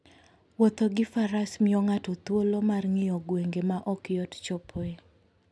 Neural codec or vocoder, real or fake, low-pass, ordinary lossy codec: none; real; none; none